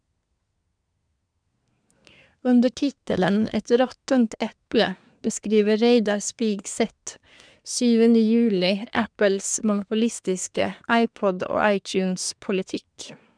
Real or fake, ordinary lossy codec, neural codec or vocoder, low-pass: fake; none; codec, 24 kHz, 1 kbps, SNAC; 9.9 kHz